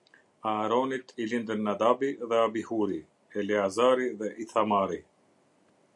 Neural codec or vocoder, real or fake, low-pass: none; real; 10.8 kHz